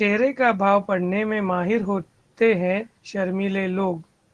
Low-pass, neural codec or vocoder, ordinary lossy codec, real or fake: 10.8 kHz; none; Opus, 16 kbps; real